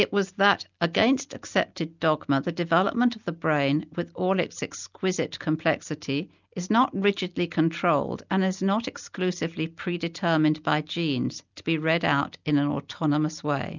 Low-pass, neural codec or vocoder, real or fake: 7.2 kHz; none; real